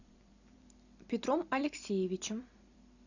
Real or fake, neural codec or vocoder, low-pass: fake; vocoder, 44.1 kHz, 128 mel bands every 256 samples, BigVGAN v2; 7.2 kHz